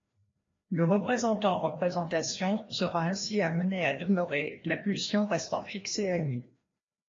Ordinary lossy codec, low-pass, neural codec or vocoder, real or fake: AAC, 32 kbps; 7.2 kHz; codec, 16 kHz, 1 kbps, FreqCodec, larger model; fake